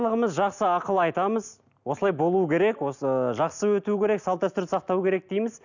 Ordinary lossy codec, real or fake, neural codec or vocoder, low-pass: none; real; none; 7.2 kHz